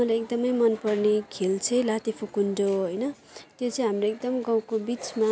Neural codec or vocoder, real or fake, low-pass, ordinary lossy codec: none; real; none; none